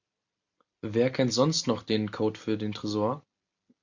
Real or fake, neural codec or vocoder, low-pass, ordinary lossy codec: real; none; 7.2 kHz; MP3, 48 kbps